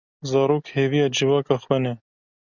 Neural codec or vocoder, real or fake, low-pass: none; real; 7.2 kHz